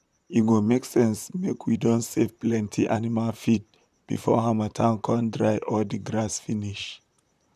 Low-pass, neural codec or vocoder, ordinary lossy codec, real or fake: 14.4 kHz; vocoder, 44.1 kHz, 128 mel bands, Pupu-Vocoder; none; fake